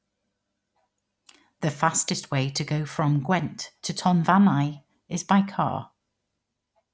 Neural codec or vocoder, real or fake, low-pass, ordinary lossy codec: none; real; none; none